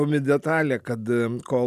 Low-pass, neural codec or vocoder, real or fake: 14.4 kHz; none; real